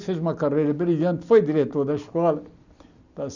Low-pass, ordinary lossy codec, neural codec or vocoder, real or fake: 7.2 kHz; none; none; real